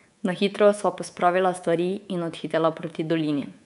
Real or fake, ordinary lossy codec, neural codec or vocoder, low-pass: fake; none; codec, 24 kHz, 3.1 kbps, DualCodec; 10.8 kHz